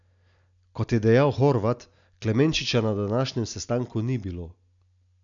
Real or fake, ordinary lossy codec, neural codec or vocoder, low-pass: real; none; none; 7.2 kHz